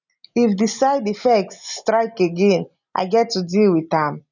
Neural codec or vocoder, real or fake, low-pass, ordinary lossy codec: none; real; 7.2 kHz; none